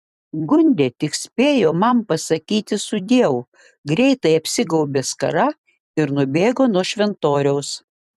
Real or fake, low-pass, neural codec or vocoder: fake; 14.4 kHz; vocoder, 44.1 kHz, 128 mel bands every 256 samples, BigVGAN v2